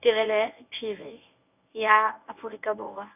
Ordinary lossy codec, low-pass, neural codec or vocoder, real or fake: none; 3.6 kHz; codec, 24 kHz, 0.9 kbps, WavTokenizer, medium speech release version 1; fake